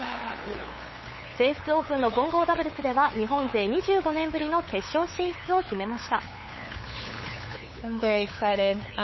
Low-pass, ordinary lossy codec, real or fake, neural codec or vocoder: 7.2 kHz; MP3, 24 kbps; fake; codec, 16 kHz, 8 kbps, FunCodec, trained on LibriTTS, 25 frames a second